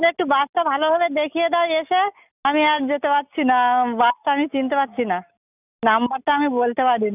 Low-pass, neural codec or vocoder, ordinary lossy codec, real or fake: 3.6 kHz; none; none; real